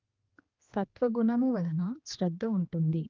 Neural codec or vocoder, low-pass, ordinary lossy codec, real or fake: codec, 16 kHz, 2 kbps, X-Codec, HuBERT features, trained on general audio; 7.2 kHz; Opus, 32 kbps; fake